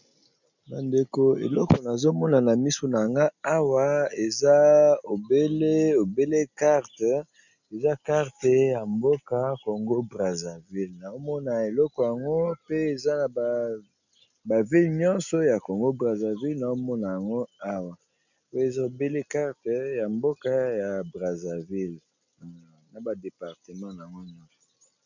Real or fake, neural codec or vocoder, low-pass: real; none; 7.2 kHz